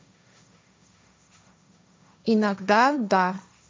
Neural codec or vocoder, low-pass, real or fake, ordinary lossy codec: codec, 16 kHz, 1.1 kbps, Voila-Tokenizer; none; fake; none